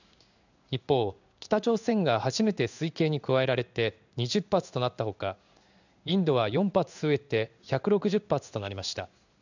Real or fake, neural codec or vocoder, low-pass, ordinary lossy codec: fake; codec, 16 kHz in and 24 kHz out, 1 kbps, XY-Tokenizer; 7.2 kHz; none